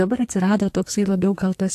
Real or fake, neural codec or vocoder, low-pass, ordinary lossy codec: fake; codec, 44.1 kHz, 2.6 kbps, SNAC; 14.4 kHz; AAC, 64 kbps